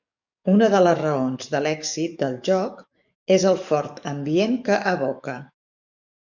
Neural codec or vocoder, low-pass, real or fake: codec, 44.1 kHz, 7.8 kbps, DAC; 7.2 kHz; fake